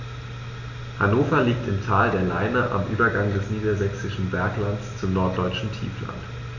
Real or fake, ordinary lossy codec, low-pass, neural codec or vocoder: real; none; 7.2 kHz; none